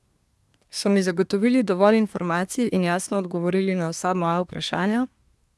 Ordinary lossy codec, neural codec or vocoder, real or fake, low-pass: none; codec, 24 kHz, 1 kbps, SNAC; fake; none